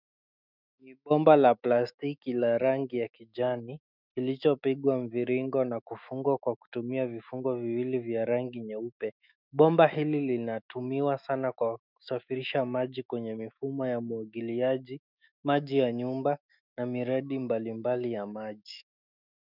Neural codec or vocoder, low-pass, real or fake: autoencoder, 48 kHz, 128 numbers a frame, DAC-VAE, trained on Japanese speech; 5.4 kHz; fake